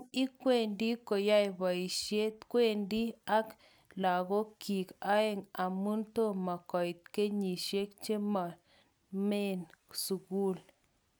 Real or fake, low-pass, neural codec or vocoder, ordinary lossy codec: real; none; none; none